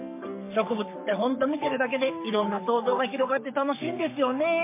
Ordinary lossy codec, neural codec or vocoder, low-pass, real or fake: MP3, 24 kbps; codec, 44.1 kHz, 3.4 kbps, Pupu-Codec; 3.6 kHz; fake